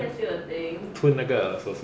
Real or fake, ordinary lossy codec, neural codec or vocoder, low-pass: real; none; none; none